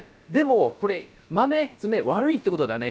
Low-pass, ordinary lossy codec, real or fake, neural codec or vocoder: none; none; fake; codec, 16 kHz, about 1 kbps, DyCAST, with the encoder's durations